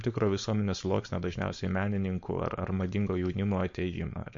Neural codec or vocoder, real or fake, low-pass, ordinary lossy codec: codec, 16 kHz, 4.8 kbps, FACodec; fake; 7.2 kHz; MP3, 48 kbps